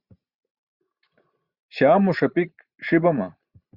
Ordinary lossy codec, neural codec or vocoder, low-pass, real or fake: Opus, 64 kbps; vocoder, 44.1 kHz, 128 mel bands every 512 samples, BigVGAN v2; 5.4 kHz; fake